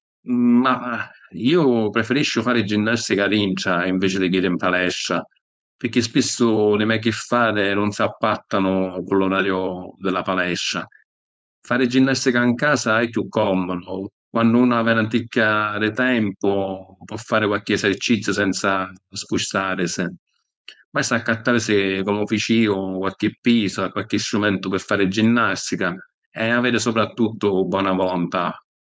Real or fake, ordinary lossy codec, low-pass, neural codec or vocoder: fake; none; none; codec, 16 kHz, 4.8 kbps, FACodec